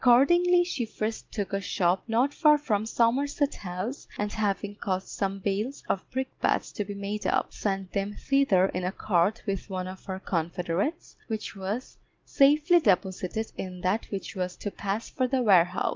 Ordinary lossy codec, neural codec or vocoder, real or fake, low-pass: Opus, 24 kbps; none; real; 7.2 kHz